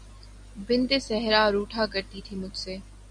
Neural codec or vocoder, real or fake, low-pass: none; real; 9.9 kHz